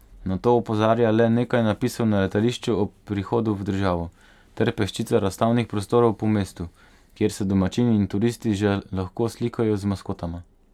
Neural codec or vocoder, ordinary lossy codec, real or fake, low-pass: none; none; real; 19.8 kHz